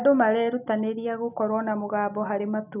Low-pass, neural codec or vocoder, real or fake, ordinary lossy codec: 3.6 kHz; none; real; none